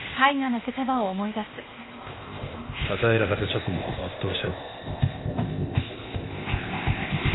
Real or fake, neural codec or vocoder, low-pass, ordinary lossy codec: fake; codec, 16 kHz, 0.8 kbps, ZipCodec; 7.2 kHz; AAC, 16 kbps